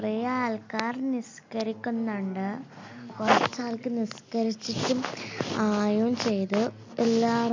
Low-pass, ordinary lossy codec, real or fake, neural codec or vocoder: 7.2 kHz; MP3, 64 kbps; real; none